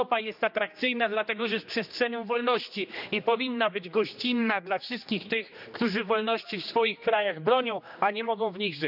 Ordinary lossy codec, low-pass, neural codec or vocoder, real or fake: none; 5.4 kHz; codec, 16 kHz, 2 kbps, X-Codec, HuBERT features, trained on general audio; fake